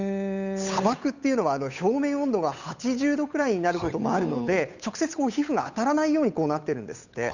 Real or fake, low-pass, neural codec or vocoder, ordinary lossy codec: fake; 7.2 kHz; codec, 16 kHz, 8 kbps, FunCodec, trained on Chinese and English, 25 frames a second; none